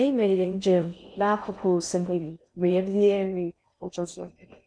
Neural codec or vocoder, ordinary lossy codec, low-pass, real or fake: codec, 16 kHz in and 24 kHz out, 0.6 kbps, FocalCodec, streaming, 4096 codes; none; 9.9 kHz; fake